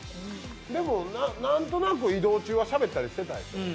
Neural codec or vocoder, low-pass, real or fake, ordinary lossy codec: none; none; real; none